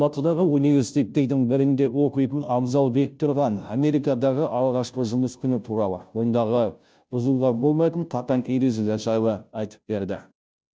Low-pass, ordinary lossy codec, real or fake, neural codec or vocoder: none; none; fake; codec, 16 kHz, 0.5 kbps, FunCodec, trained on Chinese and English, 25 frames a second